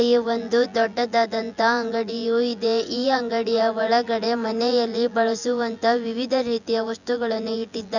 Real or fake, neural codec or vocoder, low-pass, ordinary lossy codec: fake; vocoder, 24 kHz, 100 mel bands, Vocos; 7.2 kHz; none